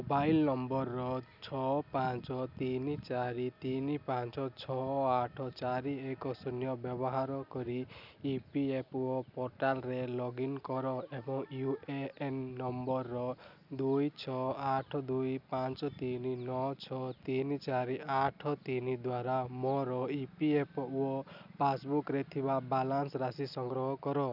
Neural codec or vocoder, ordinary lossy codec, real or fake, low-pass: none; none; real; 5.4 kHz